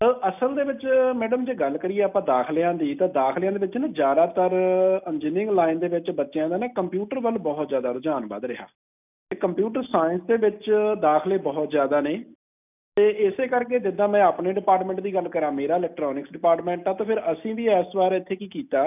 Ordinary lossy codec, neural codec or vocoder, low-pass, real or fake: none; none; 3.6 kHz; real